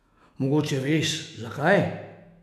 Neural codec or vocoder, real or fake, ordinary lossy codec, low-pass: autoencoder, 48 kHz, 128 numbers a frame, DAC-VAE, trained on Japanese speech; fake; none; 14.4 kHz